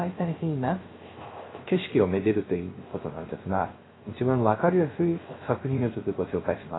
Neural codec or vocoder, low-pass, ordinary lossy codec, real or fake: codec, 16 kHz, 0.3 kbps, FocalCodec; 7.2 kHz; AAC, 16 kbps; fake